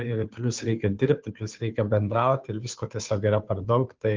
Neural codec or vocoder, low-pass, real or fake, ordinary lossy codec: codec, 16 kHz, 2 kbps, FunCodec, trained on Chinese and English, 25 frames a second; 7.2 kHz; fake; Opus, 24 kbps